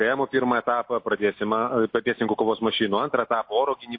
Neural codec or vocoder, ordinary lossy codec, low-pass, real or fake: none; MP3, 32 kbps; 5.4 kHz; real